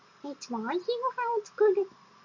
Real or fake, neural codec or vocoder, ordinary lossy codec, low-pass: real; none; MP3, 48 kbps; 7.2 kHz